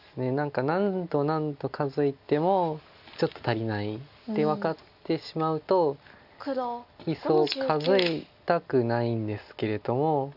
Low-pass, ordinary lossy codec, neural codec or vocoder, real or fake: 5.4 kHz; none; none; real